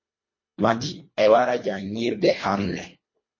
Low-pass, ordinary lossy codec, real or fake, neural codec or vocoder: 7.2 kHz; MP3, 32 kbps; fake; codec, 24 kHz, 1.5 kbps, HILCodec